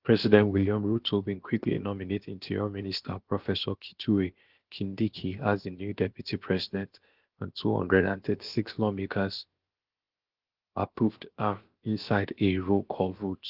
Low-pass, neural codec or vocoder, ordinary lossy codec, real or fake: 5.4 kHz; codec, 16 kHz, about 1 kbps, DyCAST, with the encoder's durations; Opus, 16 kbps; fake